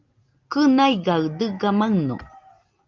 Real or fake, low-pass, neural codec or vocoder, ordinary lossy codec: real; 7.2 kHz; none; Opus, 24 kbps